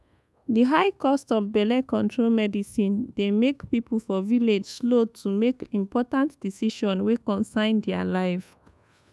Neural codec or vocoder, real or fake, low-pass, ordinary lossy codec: codec, 24 kHz, 1.2 kbps, DualCodec; fake; none; none